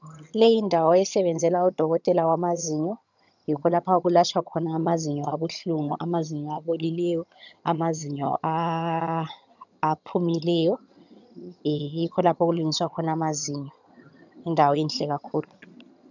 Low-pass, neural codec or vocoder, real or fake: 7.2 kHz; vocoder, 22.05 kHz, 80 mel bands, HiFi-GAN; fake